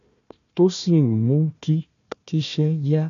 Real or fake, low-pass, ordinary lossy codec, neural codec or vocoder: fake; 7.2 kHz; none; codec, 16 kHz, 1 kbps, FunCodec, trained on Chinese and English, 50 frames a second